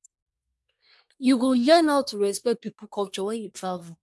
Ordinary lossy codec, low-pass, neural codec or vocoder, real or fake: none; none; codec, 24 kHz, 1 kbps, SNAC; fake